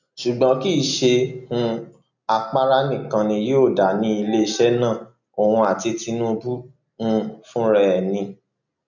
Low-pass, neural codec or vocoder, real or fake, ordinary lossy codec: 7.2 kHz; none; real; none